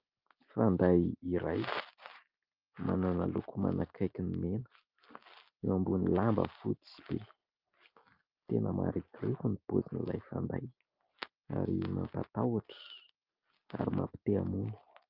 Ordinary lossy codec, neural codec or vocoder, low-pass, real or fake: Opus, 24 kbps; none; 5.4 kHz; real